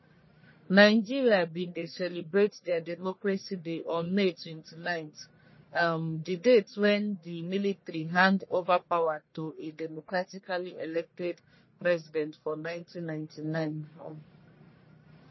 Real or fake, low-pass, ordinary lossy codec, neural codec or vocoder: fake; 7.2 kHz; MP3, 24 kbps; codec, 44.1 kHz, 1.7 kbps, Pupu-Codec